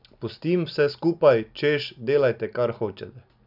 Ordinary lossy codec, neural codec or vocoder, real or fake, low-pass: none; none; real; 5.4 kHz